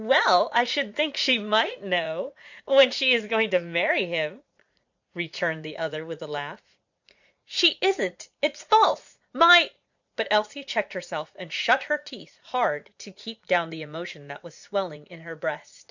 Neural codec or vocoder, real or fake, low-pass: vocoder, 44.1 kHz, 80 mel bands, Vocos; fake; 7.2 kHz